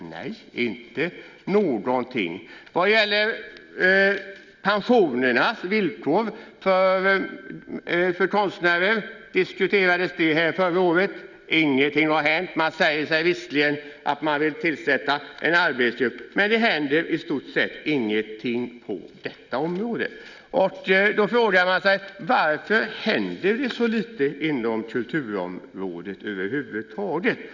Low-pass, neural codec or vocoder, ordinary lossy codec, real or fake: 7.2 kHz; none; none; real